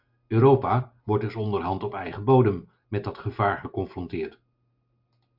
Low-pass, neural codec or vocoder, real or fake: 5.4 kHz; none; real